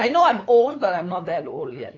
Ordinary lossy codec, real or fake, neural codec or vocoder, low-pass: AAC, 32 kbps; fake; codec, 16 kHz, 8 kbps, FunCodec, trained on LibriTTS, 25 frames a second; 7.2 kHz